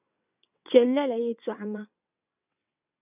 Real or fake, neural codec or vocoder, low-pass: fake; vocoder, 22.05 kHz, 80 mel bands, WaveNeXt; 3.6 kHz